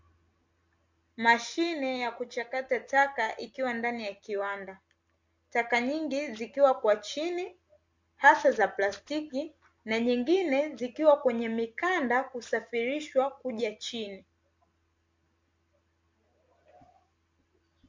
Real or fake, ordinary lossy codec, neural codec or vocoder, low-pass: real; MP3, 48 kbps; none; 7.2 kHz